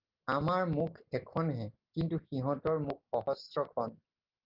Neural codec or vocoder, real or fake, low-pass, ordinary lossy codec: none; real; 5.4 kHz; Opus, 32 kbps